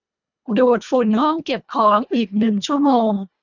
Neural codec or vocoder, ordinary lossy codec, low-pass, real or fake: codec, 24 kHz, 1.5 kbps, HILCodec; none; 7.2 kHz; fake